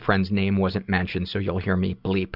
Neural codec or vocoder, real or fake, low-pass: none; real; 5.4 kHz